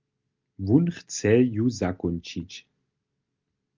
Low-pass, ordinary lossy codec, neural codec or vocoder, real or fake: 7.2 kHz; Opus, 32 kbps; none; real